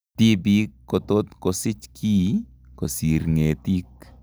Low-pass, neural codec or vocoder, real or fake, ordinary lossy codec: none; none; real; none